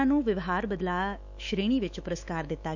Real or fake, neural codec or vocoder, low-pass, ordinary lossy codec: fake; autoencoder, 48 kHz, 128 numbers a frame, DAC-VAE, trained on Japanese speech; 7.2 kHz; none